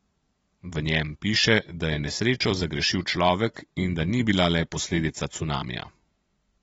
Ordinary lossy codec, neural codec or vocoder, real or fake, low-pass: AAC, 24 kbps; vocoder, 44.1 kHz, 128 mel bands every 256 samples, BigVGAN v2; fake; 19.8 kHz